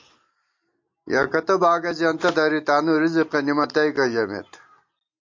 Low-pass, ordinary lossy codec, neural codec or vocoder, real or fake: 7.2 kHz; MP3, 48 kbps; none; real